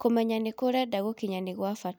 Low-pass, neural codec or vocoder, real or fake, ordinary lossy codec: none; none; real; none